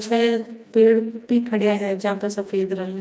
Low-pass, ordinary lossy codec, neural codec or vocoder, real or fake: none; none; codec, 16 kHz, 1 kbps, FreqCodec, smaller model; fake